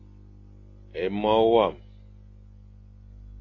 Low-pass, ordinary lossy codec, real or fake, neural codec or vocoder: 7.2 kHz; AAC, 32 kbps; real; none